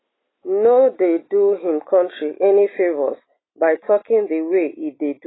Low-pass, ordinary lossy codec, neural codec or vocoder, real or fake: 7.2 kHz; AAC, 16 kbps; none; real